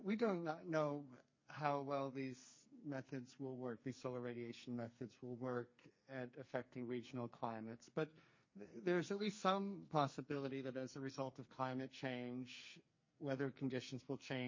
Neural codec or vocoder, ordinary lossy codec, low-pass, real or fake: codec, 44.1 kHz, 2.6 kbps, SNAC; MP3, 32 kbps; 7.2 kHz; fake